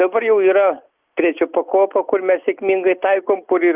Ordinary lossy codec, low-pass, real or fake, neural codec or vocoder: Opus, 24 kbps; 3.6 kHz; real; none